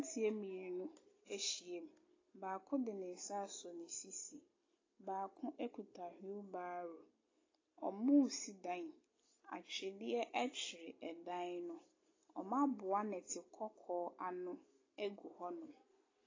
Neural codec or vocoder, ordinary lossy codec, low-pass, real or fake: none; AAC, 32 kbps; 7.2 kHz; real